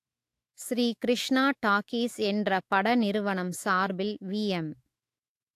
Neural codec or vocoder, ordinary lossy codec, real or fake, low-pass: autoencoder, 48 kHz, 128 numbers a frame, DAC-VAE, trained on Japanese speech; AAC, 64 kbps; fake; 14.4 kHz